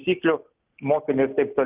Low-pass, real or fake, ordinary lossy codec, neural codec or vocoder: 3.6 kHz; real; Opus, 32 kbps; none